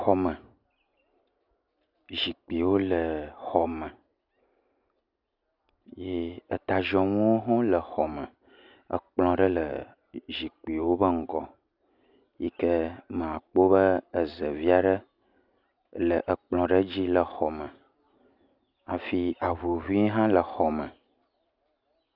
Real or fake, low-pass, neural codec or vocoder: real; 5.4 kHz; none